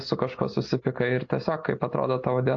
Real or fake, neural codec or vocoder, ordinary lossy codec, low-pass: real; none; AAC, 48 kbps; 7.2 kHz